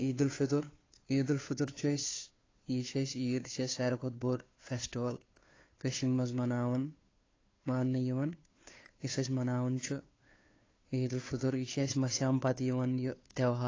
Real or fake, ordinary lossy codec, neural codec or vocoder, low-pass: fake; AAC, 32 kbps; codec, 16 kHz, 2 kbps, FunCodec, trained on Chinese and English, 25 frames a second; 7.2 kHz